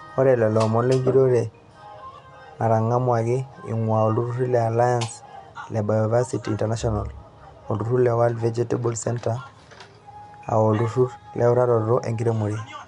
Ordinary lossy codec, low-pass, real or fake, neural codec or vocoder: MP3, 96 kbps; 10.8 kHz; real; none